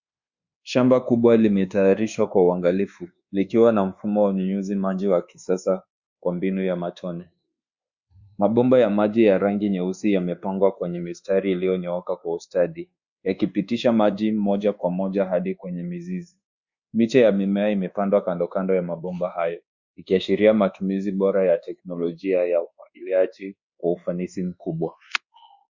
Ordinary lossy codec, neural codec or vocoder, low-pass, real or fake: Opus, 64 kbps; codec, 24 kHz, 1.2 kbps, DualCodec; 7.2 kHz; fake